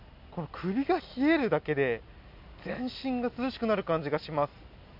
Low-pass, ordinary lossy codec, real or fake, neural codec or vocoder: 5.4 kHz; none; real; none